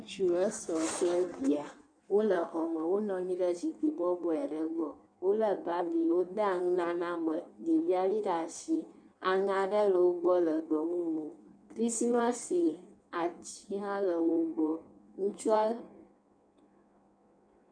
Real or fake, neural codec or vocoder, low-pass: fake; codec, 16 kHz in and 24 kHz out, 1.1 kbps, FireRedTTS-2 codec; 9.9 kHz